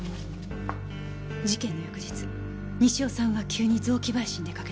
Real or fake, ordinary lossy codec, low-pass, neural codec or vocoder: real; none; none; none